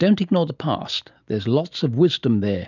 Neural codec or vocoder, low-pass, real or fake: none; 7.2 kHz; real